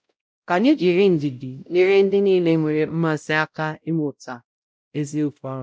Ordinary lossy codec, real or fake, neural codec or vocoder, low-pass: none; fake; codec, 16 kHz, 0.5 kbps, X-Codec, WavLM features, trained on Multilingual LibriSpeech; none